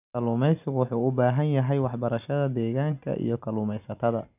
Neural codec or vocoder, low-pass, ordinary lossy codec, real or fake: none; 3.6 kHz; none; real